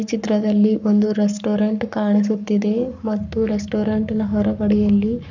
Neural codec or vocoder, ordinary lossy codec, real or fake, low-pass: codec, 44.1 kHz, 7.8 kbps, Pupu-Codec; none; fake; 7.2 kHz